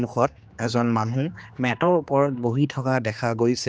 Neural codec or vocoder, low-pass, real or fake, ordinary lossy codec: codec, 16 kHz, 2 kbps, X-Codec, HuBERT features, trained on general audio; none; fake; none